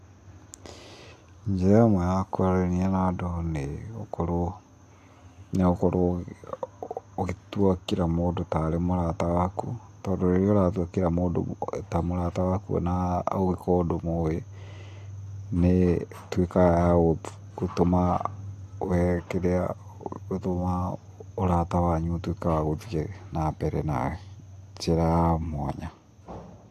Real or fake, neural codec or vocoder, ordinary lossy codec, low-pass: real; none; AAC, 64 kbps; 14.4 kHz